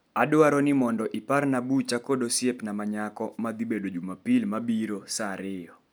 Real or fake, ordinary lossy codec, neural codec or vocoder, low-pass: real; none; none; none